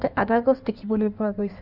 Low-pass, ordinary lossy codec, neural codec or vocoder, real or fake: 5.4 kHz; none; codec, 16 kHz in and 24 kHz out, 1.1 kbps, FireRedTTS-2 codec; fake